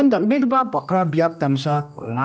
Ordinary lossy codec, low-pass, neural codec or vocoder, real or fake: none; none; codec, 16 kHz, 1 kbps, X-Codec, HuBERT features, trained on general audio; fake